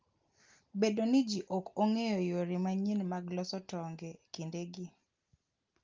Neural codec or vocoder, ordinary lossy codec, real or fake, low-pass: none; Opus, 24 kbps; real; 7.2 kHz